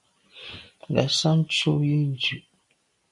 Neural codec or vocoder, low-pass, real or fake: none; 10.8 kHz; real